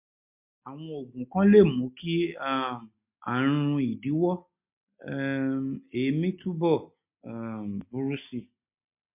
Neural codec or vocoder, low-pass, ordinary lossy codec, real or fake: none; 3.6 kHz; MP3, 32 kbps; real